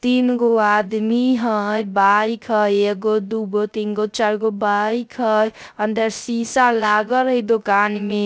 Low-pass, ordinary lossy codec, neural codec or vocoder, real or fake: none; none; codec, 16 kHz, 0.3 kbps, FocalCodec; fake